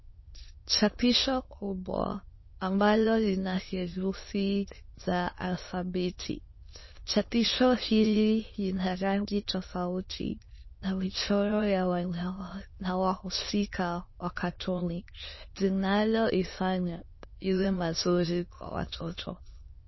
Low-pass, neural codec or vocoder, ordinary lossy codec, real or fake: 7.2 kHz; autoencoder, 22.05 kHz, a latent of 192 numbers a frame, VITS, trained on many speakers; MP3, 24 kbps; fake